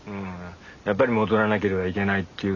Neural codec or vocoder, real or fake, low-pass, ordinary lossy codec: none; real; 7.2 kHz; none